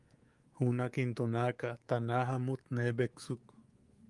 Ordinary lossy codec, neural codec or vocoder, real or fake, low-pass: Opus, 24 kbps; codec, 24 kHz, 3.1 kbps, DualCodec; fake; 10.8 kHz